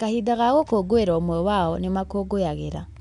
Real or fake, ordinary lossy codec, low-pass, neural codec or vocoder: real; MP3, 96 kbps; 10.8 kHz; none